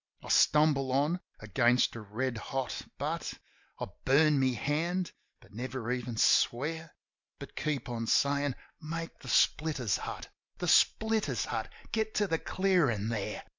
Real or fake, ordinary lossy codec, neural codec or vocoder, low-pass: real; MP3, 64 kbps; none; 7.2 kHz